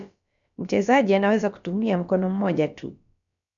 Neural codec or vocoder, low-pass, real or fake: codec, 16 kHz, about 1 kbps, DyCAST, with the encoder's durations; 7.2 kHz; fake